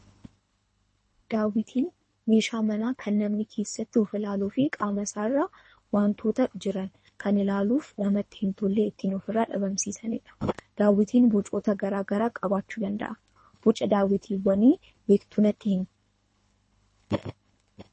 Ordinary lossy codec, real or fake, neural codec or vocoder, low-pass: MP3, 32 kbps; fake; codec, 24 kHz, 3 kbps, HILCodec; 10.8 kHz